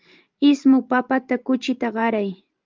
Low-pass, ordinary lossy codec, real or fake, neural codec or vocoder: 7.2 kHz; Opus, 24 kbps; fake; vocoder, 44.1 kHz, 128 mel bands every 512 samples, BigVGAN v2